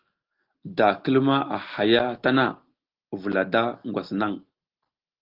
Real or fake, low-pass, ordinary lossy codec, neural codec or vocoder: real; 5.4 kHz; Opus, 16 kbps; none